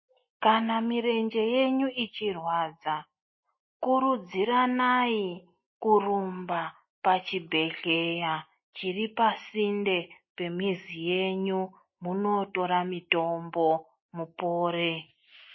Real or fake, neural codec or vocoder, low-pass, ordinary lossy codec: real; none; 7.2 kHz; MP3, 24 kbps